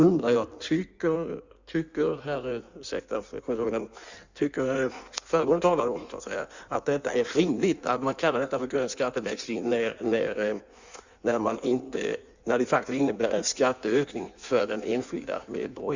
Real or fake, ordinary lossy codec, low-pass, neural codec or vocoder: fake; Opus, 64 kbps; 7.2 kHz; codec, 16 kHz in and 24 kHz out, 1.1 kbps, FireRedTTS-2 codec